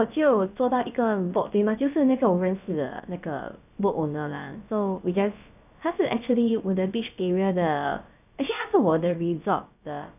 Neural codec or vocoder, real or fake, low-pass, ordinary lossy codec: codec, 16 kHz, about 1 kbps, DyCAST, with the encoder's durations; fake; 3.6 kHz; none